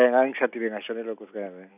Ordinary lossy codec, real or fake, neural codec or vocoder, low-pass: none; real; none; 3.6 kHz